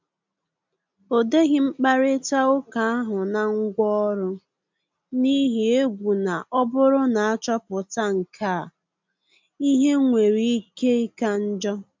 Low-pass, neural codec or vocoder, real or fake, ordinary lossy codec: 7.2 kHz; none; real; MP3, 64 kbps